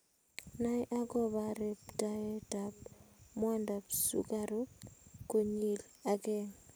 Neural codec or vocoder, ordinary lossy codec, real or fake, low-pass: none; none; real; none